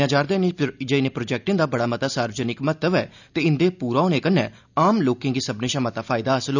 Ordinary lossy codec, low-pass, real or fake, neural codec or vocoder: none; 7.2 kHz; real; none